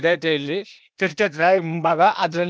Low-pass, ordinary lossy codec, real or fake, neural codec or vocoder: none; none; fake; codec, 16 kHz, 0.8 kbps, ZipCodec